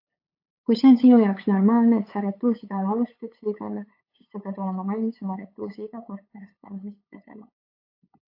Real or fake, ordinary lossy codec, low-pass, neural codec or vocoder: fake; AAC, 32 kbps; 5.4 kHz; codec, 16 kHz, 8 kbps, FunCodec, trained on LibriTTS, 25 frames a second